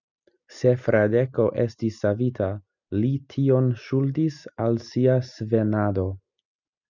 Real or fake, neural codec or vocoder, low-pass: real; none; 7.2 kHz